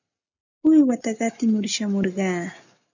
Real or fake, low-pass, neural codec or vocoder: real; 7.2 kHz; none